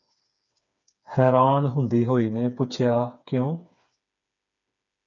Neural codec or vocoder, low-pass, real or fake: codec, 16 kHz, 4 kbps, FreqCodec, smaller model; 7.2 kHz; fake